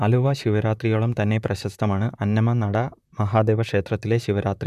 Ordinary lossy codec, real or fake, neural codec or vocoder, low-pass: none; fake; vocoder, 44.1 kHz, 128 mel bands, Pupu-Vocoder; 14.4 kHz